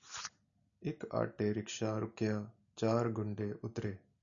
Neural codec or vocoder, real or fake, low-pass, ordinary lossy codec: none; real; 7.2 kHz; MP3, 96 kbps